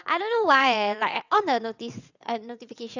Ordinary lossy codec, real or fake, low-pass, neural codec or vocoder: none; fake; 7.2 kHz; vocoder, 22.05 kHz, 80 mel bands, WaveNeXt